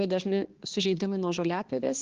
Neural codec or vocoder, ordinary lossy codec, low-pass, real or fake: codec, 16 kHz, 2 kbps, X-Codec, HuBERT features, trained on balanced general audio; Opus, 16 kbps; 7.2 kHz; fake